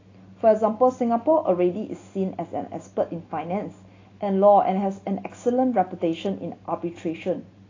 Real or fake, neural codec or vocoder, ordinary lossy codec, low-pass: real; none; AAC, 32 kbps; 7.2 kHz